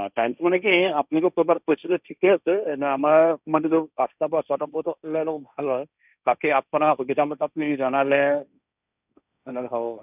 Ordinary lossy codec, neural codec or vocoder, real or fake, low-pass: none; codec, 16 kHz, 1.1 kbps, Voila-Tokenizer; fake; 3.6 kHz